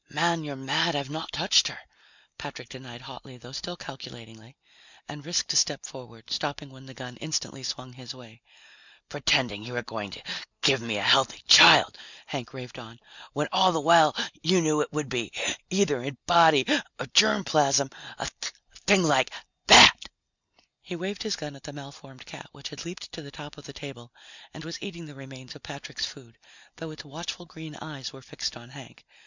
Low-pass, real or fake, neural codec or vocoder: 7.2 kHz; real; none